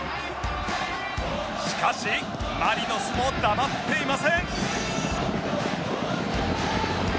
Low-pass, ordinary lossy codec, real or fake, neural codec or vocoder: none; none; real; none